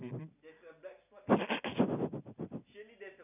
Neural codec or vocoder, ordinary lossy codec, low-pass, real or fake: none; none; 3.6 kHz; real